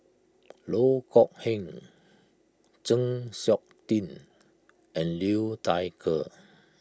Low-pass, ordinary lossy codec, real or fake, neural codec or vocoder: none; none; real; none